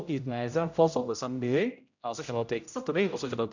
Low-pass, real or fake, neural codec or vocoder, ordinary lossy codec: 7.2 kHz; fake; codec, 16 kHz, 0.5 kbps, X-Codec, HuBERT features, trained on general audio; none